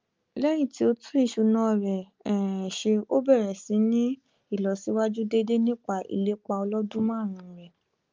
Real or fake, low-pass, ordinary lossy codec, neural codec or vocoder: real; 7.2 kHz; Opus, 24 kbps; none